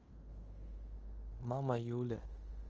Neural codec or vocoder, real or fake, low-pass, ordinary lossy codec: codec, 16 kHz in and 24 kHz out, 0.9 kbps, LongCat-Audio-Codec, fine tuned four codebook decoder; fake; 7.2 kHz; Opus, 24 kbps